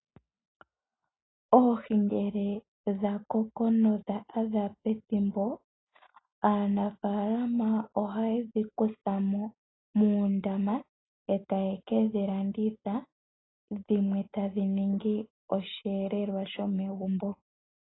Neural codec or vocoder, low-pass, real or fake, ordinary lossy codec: none; 7.2 kHz; real; AAC, 16 kbps